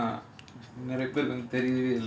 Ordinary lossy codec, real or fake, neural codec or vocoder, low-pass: none; real; none; none